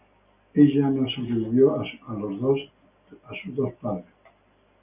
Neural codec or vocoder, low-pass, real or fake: none; 3.6 kHz; real